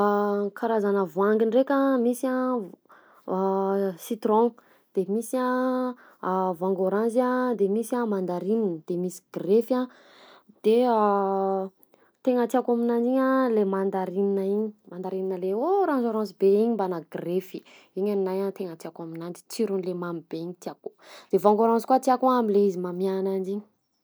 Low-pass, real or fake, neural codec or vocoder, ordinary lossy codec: none; real; none; none